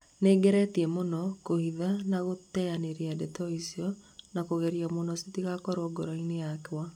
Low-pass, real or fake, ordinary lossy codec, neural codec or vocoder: 19.8 kHz; real; none; none